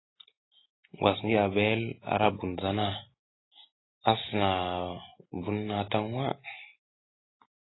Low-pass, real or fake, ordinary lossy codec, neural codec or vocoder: 7.2 kHz; real; AAC, 16 kbps; none